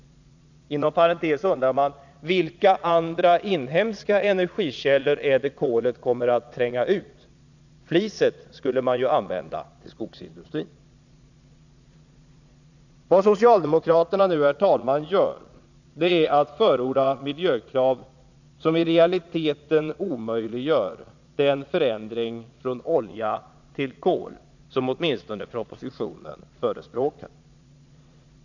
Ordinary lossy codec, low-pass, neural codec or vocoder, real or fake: none; 7.2 kHz; vocoder, 22.05 kHz, 80 mel bands, Vocos; fake